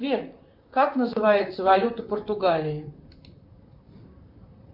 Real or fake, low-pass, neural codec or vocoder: fake; 5.4 kHz; vocoder, 44.1 kHz, 128 mel bands, Pupu-Vocoder